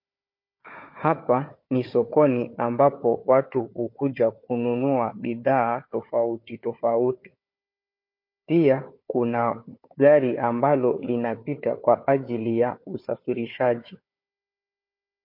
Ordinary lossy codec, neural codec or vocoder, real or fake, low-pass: MP3, 32 kbps; codec, 16 kHz, 4 kbps, FunCodec, trained on Chinese and English, 50 frames a second; fake; 5.4 kHz